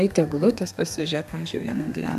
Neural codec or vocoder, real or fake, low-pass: codec, 32 kHz, 1.9 kbps, SNAC; fake; 14.4 kHz